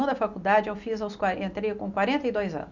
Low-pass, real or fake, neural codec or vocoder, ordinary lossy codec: 7.2 kHz; real; none; none